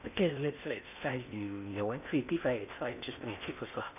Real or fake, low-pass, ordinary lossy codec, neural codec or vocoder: fake; 3.6 kHz; none; codec, 16 kHz in and 24 kHz out, 0.8 kbps, FocalCodec, streaming, 65536 codes